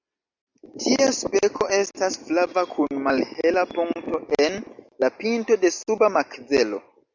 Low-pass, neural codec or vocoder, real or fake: 7.2 kHz; none; real